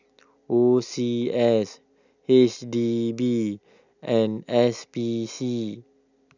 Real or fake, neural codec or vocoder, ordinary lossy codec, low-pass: real; none; none; 7.2 kHz